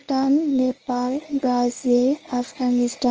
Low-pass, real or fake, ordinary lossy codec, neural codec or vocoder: 7.2 kHz; fake; Opus, 24 kbps; codec, 24 kHz, 0.9 kbps, WavTokenizer, medium speech release version 1